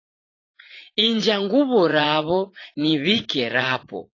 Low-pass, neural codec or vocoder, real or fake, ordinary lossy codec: 7.2 kHz; vocoder, 44.1 kHz, 80 mel bands, Vocos; fake; AAC, 32 kbps